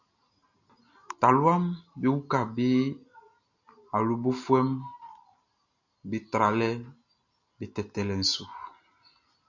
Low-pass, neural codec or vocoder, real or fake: 7.2 kHz; none; real